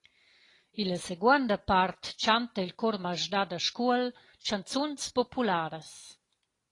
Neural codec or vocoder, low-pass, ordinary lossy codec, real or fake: none; 10.8 kHz; AAC, 32 kbps; real